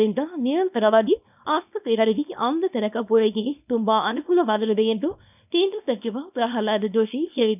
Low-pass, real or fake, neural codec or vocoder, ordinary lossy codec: 3.6 kHz; fake; codec, 24 kHz, 0.9 kbps, WavTokenizer, small release; none